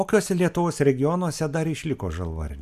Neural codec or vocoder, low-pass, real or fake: none; 14.4 kHz; real